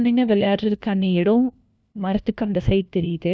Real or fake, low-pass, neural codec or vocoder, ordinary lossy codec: fake; none; codec, 16 kHz, 1 kbps, FunCodec, trained on LibriTTS, 50 frames a second; none